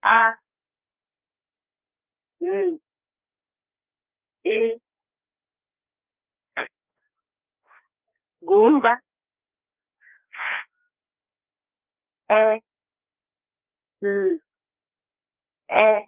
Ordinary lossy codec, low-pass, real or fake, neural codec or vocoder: Opus, 32 kbps; 3.6 kHz; fake; codec, 16 kHz, 2 kbps, FreqCodec, larger model